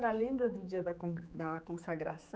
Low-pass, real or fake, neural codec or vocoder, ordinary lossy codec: none; fake; codec, 16 kHz, 4 kbps, X-Codec, HuBERT features, trained on general audio; none